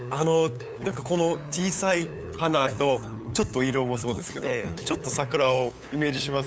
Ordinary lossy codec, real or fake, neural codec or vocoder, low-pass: none; fake; codec, 16 kHz, 8 kbps, FunCodec, trained on LibriTTS, 25 frames a second; none